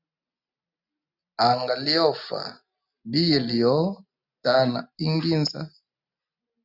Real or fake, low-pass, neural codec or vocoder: real; 5.4 kHz; none